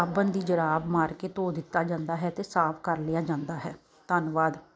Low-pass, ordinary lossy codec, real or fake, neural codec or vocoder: none; none; real; none